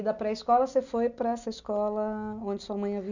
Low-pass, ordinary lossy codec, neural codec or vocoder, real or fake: 7.2 kHz; none; none; real